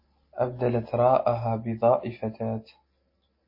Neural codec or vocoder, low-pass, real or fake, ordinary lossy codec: none; 5.4 kHz; real; MP3, 24 kbps